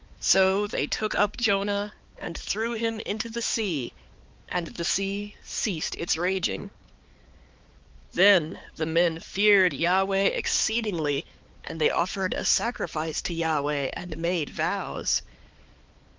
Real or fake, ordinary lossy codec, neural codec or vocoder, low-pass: fake; Opus, 32 kbps; codec, 16 kHz, 4 kbps, X-Codec, HuBERT features, trained on balanced general audio; 7.2 kHz